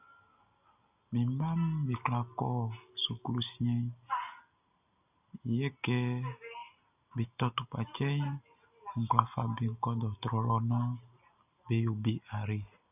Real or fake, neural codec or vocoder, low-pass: real; none; 3.6 kHz